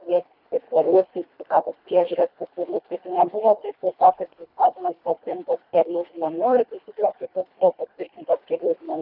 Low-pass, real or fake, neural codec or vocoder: 5.4 kHz; fake; codec, 24 kHz, 1.5 kbps, HILCodec